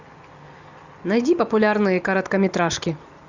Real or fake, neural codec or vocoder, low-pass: real; none; 7.2 kHz